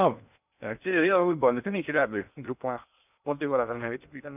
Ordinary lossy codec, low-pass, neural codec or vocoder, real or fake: none; 3.6 kHz; codec, 16 kHz in and 24 kHz out, 0.6 kbps, FocalCodec, streaming, 2048 codes; fake